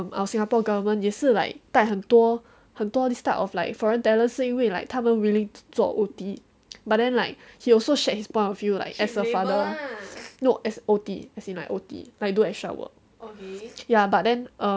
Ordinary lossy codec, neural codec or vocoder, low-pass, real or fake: none; none; none; real